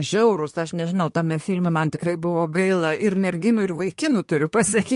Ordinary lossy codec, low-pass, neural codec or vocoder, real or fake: MP3, 48 kbps; 10.8 kHz; codec, 24 kHz, 1 kbps, SNAC; fake